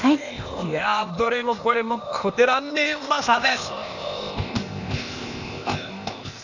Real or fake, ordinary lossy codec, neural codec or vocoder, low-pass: fake; none; codec, 16 kHz, 0.8 kbps, ZipCodec; 7.2 kHz